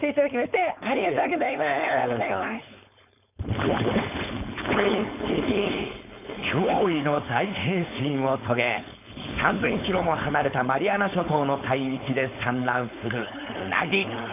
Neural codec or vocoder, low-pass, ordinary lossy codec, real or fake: codec, 16 kHz, 4.8 kbps, FACodec; 3.6 kHz; none; fake